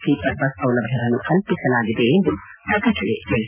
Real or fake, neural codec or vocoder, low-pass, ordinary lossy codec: real; none; 3.6 kHz; none